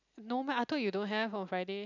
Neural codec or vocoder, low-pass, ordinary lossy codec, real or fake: none; 7.2 kHz; none; real